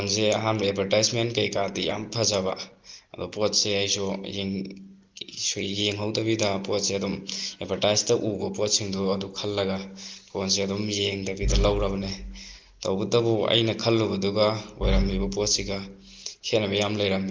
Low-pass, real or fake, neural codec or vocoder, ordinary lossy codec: 7.2 kHz; real; none; Opus, 24 kbps